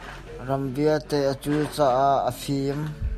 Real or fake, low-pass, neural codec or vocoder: real; 14.4 kHz; none